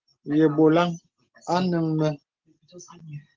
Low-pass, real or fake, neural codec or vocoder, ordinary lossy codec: 7.2 kHz; real; none; Opus, 16 kbps